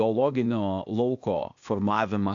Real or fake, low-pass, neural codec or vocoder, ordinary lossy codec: fake; 7.2 kHz; codec, 16 kHz, 0.8 kbps, ZipCodec; AAC, 64 kbps